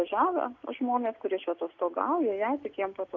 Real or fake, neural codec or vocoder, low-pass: real; none; 7.2 kHz